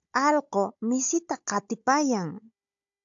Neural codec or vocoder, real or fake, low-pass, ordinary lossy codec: codec, 16 kHz, 16 kbps, FunCodec, trained on Chinese and English, 50 frames a second; fake; 7.2 kHz; AAC, 64 kbps